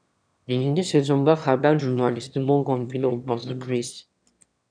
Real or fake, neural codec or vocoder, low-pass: fake; autoencoder, 22.05 kHz, a latent of 192 numbers a frame, VITS, trained on one speaker; 9.9 kHz